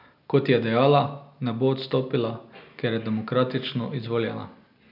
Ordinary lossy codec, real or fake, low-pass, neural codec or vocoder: none; real; 5.4 kHz; none